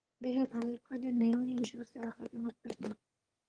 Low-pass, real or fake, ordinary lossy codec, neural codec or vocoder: 9.9 kHz; fake; Opus, 24 kbps; autoencoder, 22.05 kHz, a latent of 192 numbers a frame, VITS, trained on one speaker